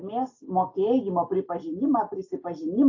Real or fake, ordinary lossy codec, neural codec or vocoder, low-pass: real; AAC, 48 kbps; none; 7.2 kHz